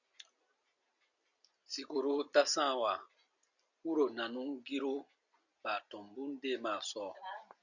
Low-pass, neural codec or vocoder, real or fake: 7.2 kHz; none; real